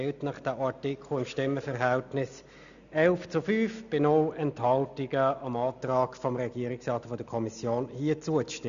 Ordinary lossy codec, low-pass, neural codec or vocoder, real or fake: none; 7.2 kHz; none; real